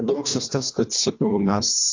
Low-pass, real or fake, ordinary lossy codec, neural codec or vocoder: 7.2 kHz; fake; AAC, 48 kbps; codec, 24 kHz, 1.5 kbps, HILCodec